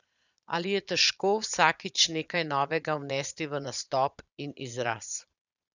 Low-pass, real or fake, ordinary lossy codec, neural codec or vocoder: 7.2 kHz; fake; none; vocoder, 22.05 kHz, 80 mel bands, Vocos